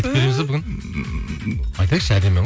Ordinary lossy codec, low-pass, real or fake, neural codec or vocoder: none; none; real; none